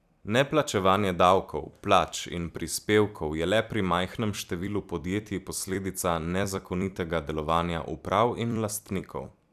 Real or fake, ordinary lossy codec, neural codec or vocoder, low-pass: fake; none; vocoder, 44.1 kHz, 128 mel bands every 256 samples, BigVGAN v2; 14.4 kHz